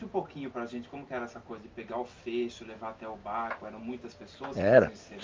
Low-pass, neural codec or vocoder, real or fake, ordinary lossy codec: 7.2 kHz; none; real; Opus, 24 kbps